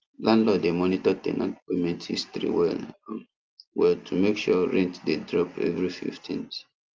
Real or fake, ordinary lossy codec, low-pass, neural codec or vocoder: real; Opus, 24 kbps; 7.2 kHz; none